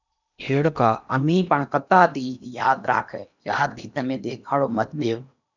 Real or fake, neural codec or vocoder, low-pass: fake; codec, 16 kHz in and 24 kHz out, 0.8 kbps, FocalCodec, streaming, 65536 codes; 7.2 kHz